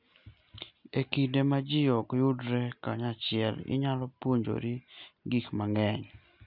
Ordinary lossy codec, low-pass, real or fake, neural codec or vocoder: none; 5.4 kHz; real; none